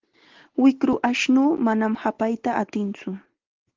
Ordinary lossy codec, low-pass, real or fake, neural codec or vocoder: Opus, 16 kbps; 7.2 kHz; real; none